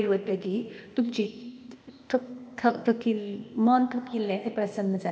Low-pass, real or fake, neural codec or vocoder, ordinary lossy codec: none; fake; codec, 16 kHz, 0.8 kbps, ZipCodec; none